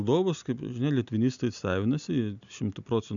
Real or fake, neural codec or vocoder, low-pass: real; none; 7.2 kHz